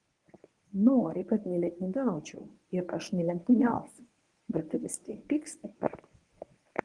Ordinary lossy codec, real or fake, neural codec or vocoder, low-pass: Opus, 24 kbps; fake; codec, 24 kHz, 0.9 kbps, WavTokenizer, medium speech release version 1; 10.8 kHz